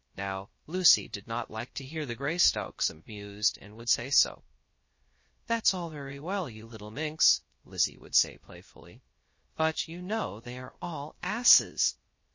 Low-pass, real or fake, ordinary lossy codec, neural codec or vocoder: 7.2 kHz; fake; MP3, 32 kbps; codec, 16 kHz, 0.3 kbps, FocalCodec